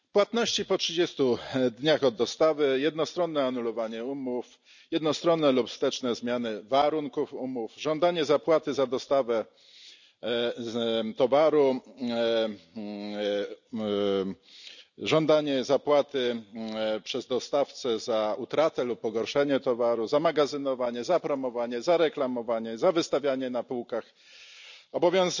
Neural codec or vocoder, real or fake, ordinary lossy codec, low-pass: none; real; none; 7.2 kHz